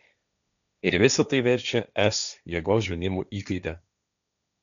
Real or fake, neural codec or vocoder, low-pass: fake; codec, 16 kHz, 1.1 kbps, Voila-Tokenizer; 7.2 kHz